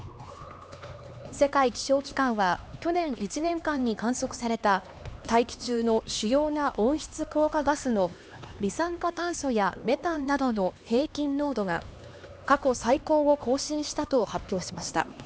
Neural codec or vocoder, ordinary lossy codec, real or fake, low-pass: codec, 16 kHz, 2 kbps, X-Codec, HuBERT features, trained on LibriSpeech; none; fake; none